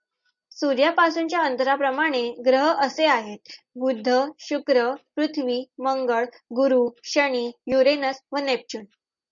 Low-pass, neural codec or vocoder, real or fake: 7.2 kHz; none; real